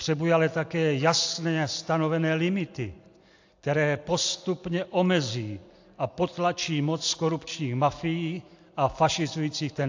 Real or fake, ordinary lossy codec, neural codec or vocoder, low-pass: real; AAC, 48 kbps; none; 7.2 kHz